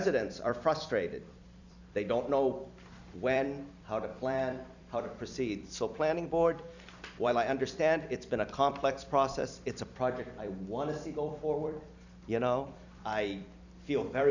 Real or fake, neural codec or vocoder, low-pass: fake; vocoder, 44.1 kHz, 128 mel bands every 256 samples, BigVGAN v2; 7.2 kHz